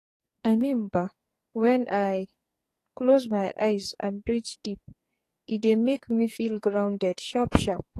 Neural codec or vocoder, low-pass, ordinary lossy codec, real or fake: codec, 44.1 kHz, 2.6 kbps, SNAC; 14.4 kHz; AAC, 48 kbps; fake